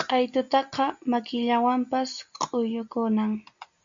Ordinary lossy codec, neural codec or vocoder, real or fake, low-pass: MP3, 64 kbps; none; real; 7.2 kHz